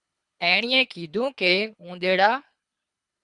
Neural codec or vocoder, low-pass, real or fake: codec, 24 kHz, 3 kbps, HILCodec; 10.8 kHz; fake